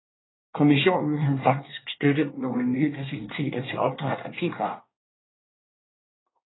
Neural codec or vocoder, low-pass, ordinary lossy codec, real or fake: codec, 24 kHz, 1 kbps, SNAC; 7.2 kHz; AAC, 16 kbps; fake